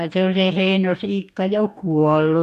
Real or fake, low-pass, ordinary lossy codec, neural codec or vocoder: fake; 14.4 kHz; none; codec, 44.1 kHz, 2.6 kbps, DAC